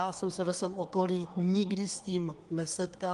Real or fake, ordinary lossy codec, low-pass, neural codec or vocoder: fake; Opus, 32 kbps; 10.8 kHz; codec, 24 kHz, 1 kbps, SNAC